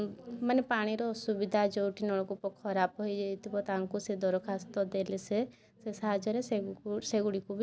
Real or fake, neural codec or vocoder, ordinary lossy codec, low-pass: real; none; none; none